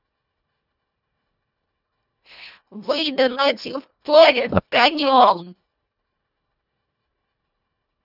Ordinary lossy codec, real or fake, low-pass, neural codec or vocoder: none; fake; 5.4 kHz; codec, 24 kHz, 1.5 kbps, HILCodec